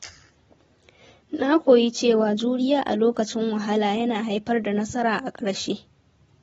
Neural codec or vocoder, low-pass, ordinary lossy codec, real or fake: none; 19.8 kHz; AAC, 24 kbps; real